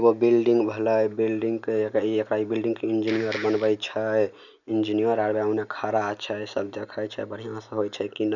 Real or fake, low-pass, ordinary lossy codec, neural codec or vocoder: real; 7.2 kHz; none; none